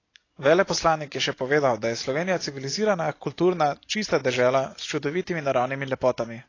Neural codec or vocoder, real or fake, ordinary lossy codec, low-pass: none; real; AAC, 32 kbps; 7.2 kHz